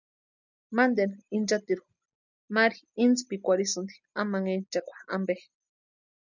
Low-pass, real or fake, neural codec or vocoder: 7.2 kHz; real; none